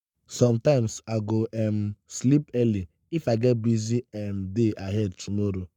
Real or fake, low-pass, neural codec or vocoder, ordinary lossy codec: fake; 19.8 kHz; codec, 44.1 kHz, 7.8 kbps, Pupu-Codec; none